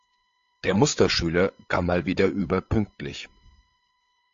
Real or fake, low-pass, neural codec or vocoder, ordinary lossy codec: fake; 7.2 kHz; codec, 16 kHz, 8 kbps, FreqCodec, larger model; AAC, 48 kbps